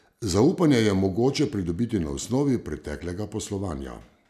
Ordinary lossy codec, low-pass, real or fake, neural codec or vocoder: none; 14.4 kHz; real; none